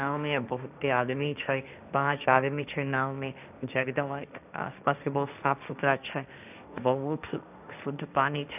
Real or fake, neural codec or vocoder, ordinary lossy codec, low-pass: fake; codec, 16 kHz, 1.1 kbps, Voila-Tokenizer; none; 3.6 kHz